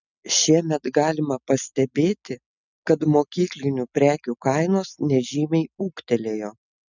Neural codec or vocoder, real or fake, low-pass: none; real; 7.2 kHz